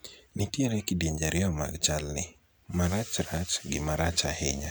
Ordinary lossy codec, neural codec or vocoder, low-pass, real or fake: none; none; none; real